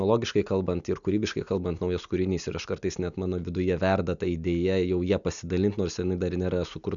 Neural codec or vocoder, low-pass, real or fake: none; 7.2 kHz; real